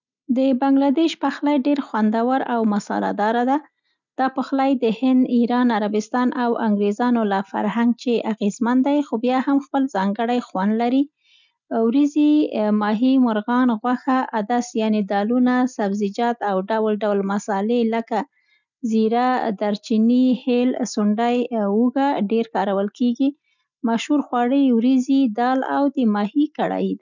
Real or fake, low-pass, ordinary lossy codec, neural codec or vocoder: real; 7.2 kHz; none; none